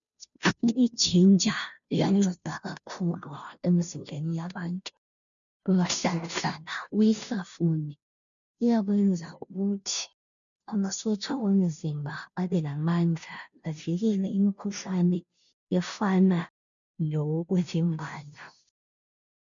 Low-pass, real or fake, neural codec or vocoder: 7.2 kHz; fake; codec, 16 kHz, 0.5 kbps, FunCodec, trained on Chinese and English, 25 frames a second